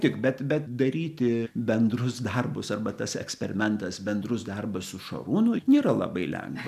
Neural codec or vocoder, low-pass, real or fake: none; 14.4 kHz; real